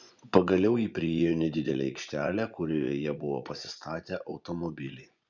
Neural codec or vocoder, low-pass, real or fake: vocoder, 24 kHz, 100 mel bands, Vocos; 7.2 kHz; fake